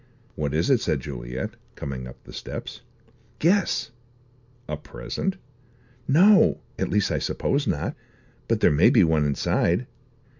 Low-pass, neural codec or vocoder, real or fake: 7.2 kHz; none; real